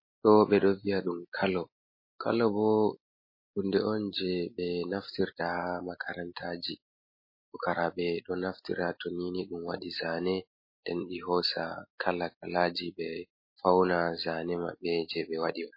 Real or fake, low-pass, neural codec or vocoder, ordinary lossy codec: real; 5.4 kHz; none; MP3, 24 kbps